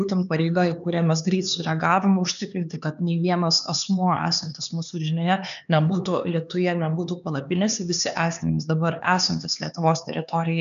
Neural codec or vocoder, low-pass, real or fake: codec, 16 kHz, 4 kbps, X-Codec, HuBERT features, trained on LibriSpeech; 7.2 kHz; fake